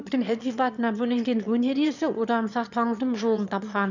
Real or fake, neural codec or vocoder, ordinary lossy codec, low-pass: fake; autoencoder, 22.05 kHz, a latent of 192 numbers a frame, VITS, trained on one speaker; none; 7.2 kHz